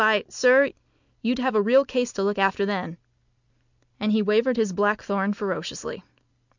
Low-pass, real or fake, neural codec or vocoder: 7.2 kHz; real; none